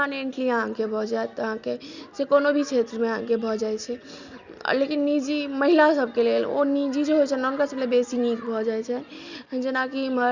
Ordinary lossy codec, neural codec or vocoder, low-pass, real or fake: Opus, 64 kbps; none; 7.2 kHz; real